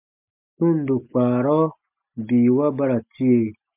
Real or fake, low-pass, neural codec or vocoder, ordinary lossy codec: real; 3.6 kHz; none; MP3, 32 kbps